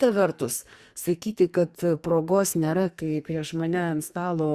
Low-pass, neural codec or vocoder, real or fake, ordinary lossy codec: 14.4 kHz; codec, 44.1 kHz, 2.6 kbps, SNAC; fake; Opus, 64 kbps